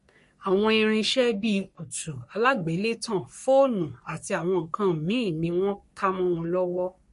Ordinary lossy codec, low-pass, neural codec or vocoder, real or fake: MP3, 48 kbps; 14.4 kHz; codec, 44.1 kHz, 3.4 kbps, Pupu-Codec; fake